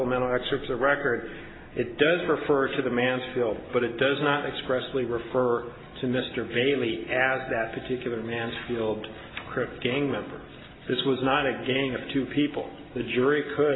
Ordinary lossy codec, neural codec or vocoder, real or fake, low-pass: AAC, 16 kbps; none; real; 7.2 kHz